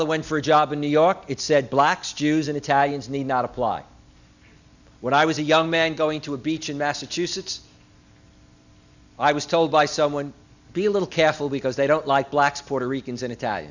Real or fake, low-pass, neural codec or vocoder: real; 7.2 kHz; none